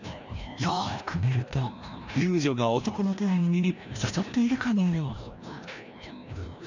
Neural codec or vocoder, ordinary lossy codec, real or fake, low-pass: codec, 16 kHz, 1 kbps, FreqCodec, larger model; none; fake; 7.2 kHz